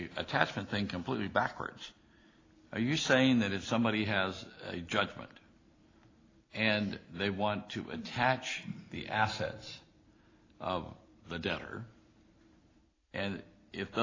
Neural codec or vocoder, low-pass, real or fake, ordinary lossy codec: none; 7.2 kHz; real; AAC, 32 kbps